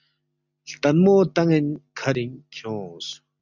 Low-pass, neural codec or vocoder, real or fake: 7.2 kHz; none; real